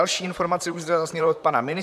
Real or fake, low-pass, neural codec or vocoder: fake; 14.4 kHz; vocoder, 44.1 kHz, 128 mel bands, Pupu-Vocoder